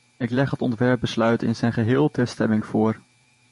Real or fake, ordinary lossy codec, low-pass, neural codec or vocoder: fake; MP3, 48 kbps; 14.4 kHz; vocoder, 48 kHz, 128 mel bands, Vocos